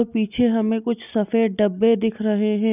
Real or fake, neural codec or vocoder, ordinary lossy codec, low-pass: real; none; none; 3.6 kHz